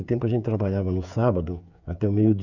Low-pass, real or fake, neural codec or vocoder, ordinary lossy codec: 7.2 kHz; fake; codec, 16 kHz, 8 kbps, FreqCodec, smaller model; none